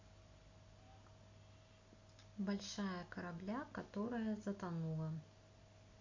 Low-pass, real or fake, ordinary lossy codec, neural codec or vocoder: 7.2 kHz; real; MP3, 48 kbps; none